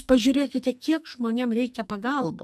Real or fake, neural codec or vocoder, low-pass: fake; codec, 44.1 kHz, 2.6 kbps, SNAC; 14.4 kHz